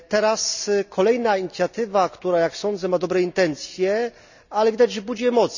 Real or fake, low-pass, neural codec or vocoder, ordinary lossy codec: real; 7.2 kHz; none; none